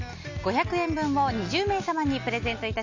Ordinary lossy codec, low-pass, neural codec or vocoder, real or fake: AAC, 48 kbps; 7.2 kHz; none; real